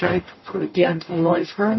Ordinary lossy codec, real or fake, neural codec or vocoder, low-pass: MP3, 24 kbps; fake; codec, 44.1 kHz, 0.9 kbps, DAC; 7.2 kHz